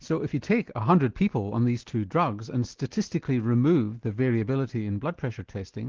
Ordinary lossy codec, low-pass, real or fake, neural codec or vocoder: Opus, 16 kbps; 7.2 kHz; real; none